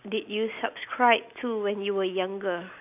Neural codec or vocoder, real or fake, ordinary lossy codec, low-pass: none; real; none; 3.6 kHz